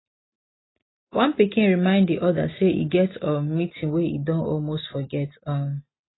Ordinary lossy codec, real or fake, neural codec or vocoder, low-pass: AAC, 16 kbps; real; none; 7.2 kHz